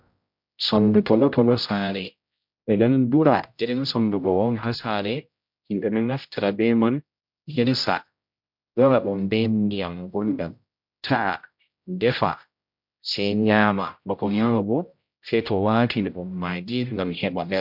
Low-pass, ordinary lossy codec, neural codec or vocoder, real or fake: 5.4 kHz; MP3, 48 kbps; codec, 16 kHz, 0.5 kbps, X-Codec, HuBERT features, trained on general audio; fake